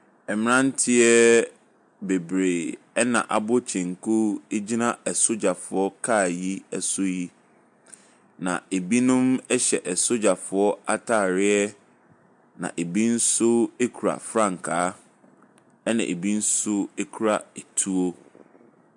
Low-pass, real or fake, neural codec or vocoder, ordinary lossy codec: 10.8 kHz; real; none; MP3, 64 kbps